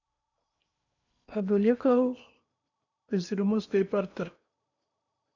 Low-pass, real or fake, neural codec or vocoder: 7.2 kHz; fake; codec, 16 kHz in and 24 kHz out, 0.8 kbps, FocalCodec, streaming, 65536 codes